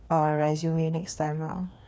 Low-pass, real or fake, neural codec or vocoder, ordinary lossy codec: none; fake; codec, 16 kHz, 2 kbps, FreqCodec, larger model; none